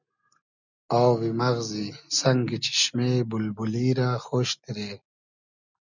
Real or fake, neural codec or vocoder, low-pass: real; none; 7.2 kHz